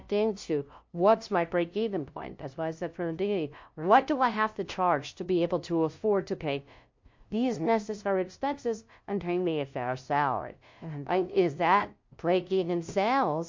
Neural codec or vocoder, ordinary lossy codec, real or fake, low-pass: codec, 16 kHz, 0.5 kbps, FunCodec, trained on LibriTTS, 25 frames a second; MP3, 48 kbps; fake; 7.2 kHz